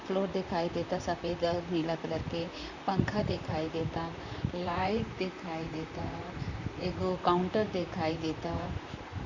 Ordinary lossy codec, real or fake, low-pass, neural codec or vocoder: none; fake; 7.2 kHz; vocoder, 44.1 kHz, 128 mel bands, Pupu-Vocoder